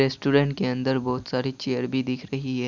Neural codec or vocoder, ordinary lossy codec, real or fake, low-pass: none; none; real; 7.2 kHz